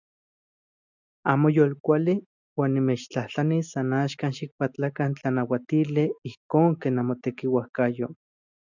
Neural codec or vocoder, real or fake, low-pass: none; real; 7.2 kHz